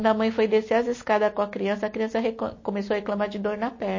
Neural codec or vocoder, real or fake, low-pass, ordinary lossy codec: none; real; 7.2 kHz; MP3, 32 kbps